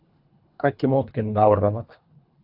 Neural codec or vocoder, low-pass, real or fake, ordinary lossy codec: codec, 24 kHz, 3 kbps, HILCodec; 5.4 kHz; fake; AAC, 48 kbps